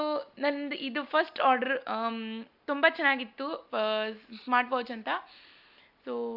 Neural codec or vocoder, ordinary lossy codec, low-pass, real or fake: none; Opus, 64 kbps; 5.4 kHz; real